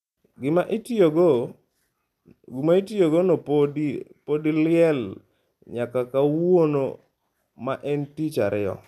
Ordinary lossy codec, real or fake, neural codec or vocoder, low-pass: none; real; none; 14.4 kHz